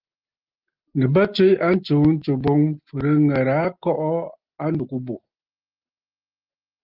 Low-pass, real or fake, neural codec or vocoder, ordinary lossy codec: 5.4 kHz; real; none; Opus, 24 kbps